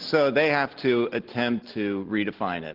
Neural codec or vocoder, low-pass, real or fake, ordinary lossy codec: none; 5.4 kHz; real; Opus, 16 kbps